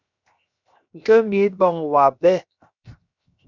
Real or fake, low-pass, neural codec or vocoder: fake; 7.2 kHz; codec, 16 kHz, 0.7 kbps, FocalCodec